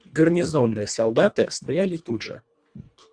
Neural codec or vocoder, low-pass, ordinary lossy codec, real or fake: codec, 24 kHz, 1.5 kbps, HILCodec; 9.9 kHz; Opus, 64 kbps; fake